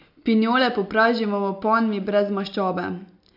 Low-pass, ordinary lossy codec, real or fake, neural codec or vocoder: 5.4 kHz; none; real; none